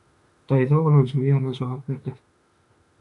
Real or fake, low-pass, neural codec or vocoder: fake; 10.8 kHz; autoencoder, 48 kHz, 32 numbers a frame, DAC-VAE, trained on Japanese speech